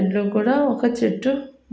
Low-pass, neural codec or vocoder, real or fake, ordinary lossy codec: none; none; real; none